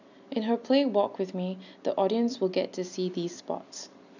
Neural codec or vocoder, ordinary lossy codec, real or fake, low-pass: none; none; real; 7.2 kHz